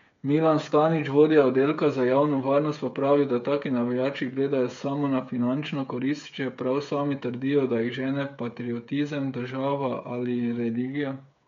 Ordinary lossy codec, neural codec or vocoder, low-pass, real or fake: MP3, 64 kbps; codec, 16 kHz, 8 kbps, FreqCodec, smaller model; 7.2 kHz; fake